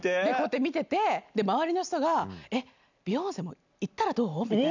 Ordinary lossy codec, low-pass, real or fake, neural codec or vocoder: none; 7.2 kHz; real; none